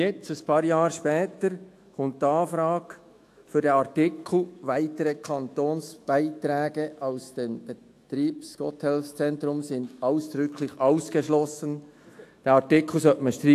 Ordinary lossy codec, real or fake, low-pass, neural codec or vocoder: AAC, 64 kbps; fake; 14.4 kHz; autoencoder, 48 kHz, 128 numbers a frame, DAC-VAE, trained on Japanese speech